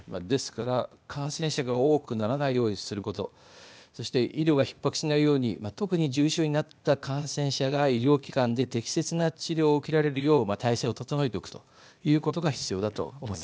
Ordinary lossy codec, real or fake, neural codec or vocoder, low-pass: none; fake; codec, 16 kHz, 0.8 kbps, ZipCodec; none